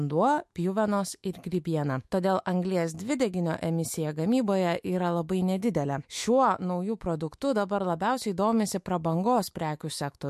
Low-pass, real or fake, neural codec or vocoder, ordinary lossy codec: 14.4 kHz; fake; autoencoder, 48 kHz, 128 numbers a frame, DAC-VAE, trained on Japanese speech; MP3, 64 kbps